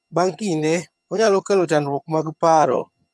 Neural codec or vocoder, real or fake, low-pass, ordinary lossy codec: vocoder, 22.05 kHz, 80 mel bands, HiFi-GAN; fake; none; none